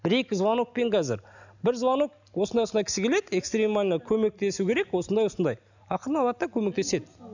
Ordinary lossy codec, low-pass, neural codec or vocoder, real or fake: none; 7.2 kHz; none; real